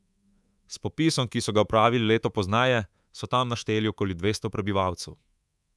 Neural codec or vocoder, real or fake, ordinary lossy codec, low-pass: codec, 24 kHz, 3.1 kbps, DualCodec; fake; none; none